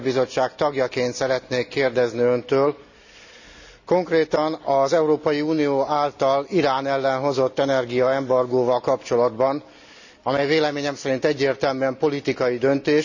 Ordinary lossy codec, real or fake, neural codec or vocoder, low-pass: none; real; none; 7.2 kHz